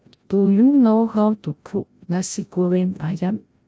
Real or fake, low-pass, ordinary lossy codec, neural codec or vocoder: fake; none; none; codec, 16 kHz, 0.5 kbps, FreqCodec, larger model